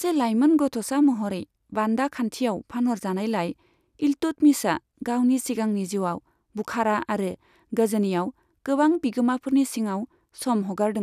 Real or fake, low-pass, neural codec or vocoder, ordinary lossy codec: real; 14.4 kHz; none; none